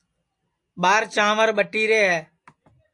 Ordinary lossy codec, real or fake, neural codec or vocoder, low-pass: AAC, 64 kbps; real; none; 10.8 kHz